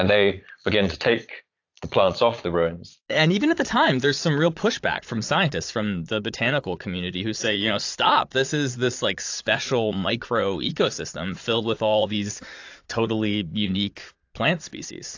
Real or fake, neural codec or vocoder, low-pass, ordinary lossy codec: fake; vocoder, 22.05 kHz, 80 mel bands, Vocos; 7.2 kHz; AAC, 48 kbps